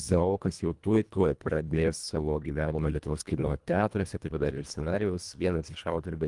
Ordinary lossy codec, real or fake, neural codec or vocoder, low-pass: Opus, 32 kbps; fake; codec, 24 kHz, 1.5 kbps, HILCodec; 10.8 kHz